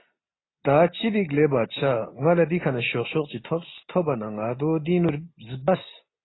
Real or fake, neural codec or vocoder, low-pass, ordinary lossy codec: real; none; 7.2 kHz; AAC, 16 kbps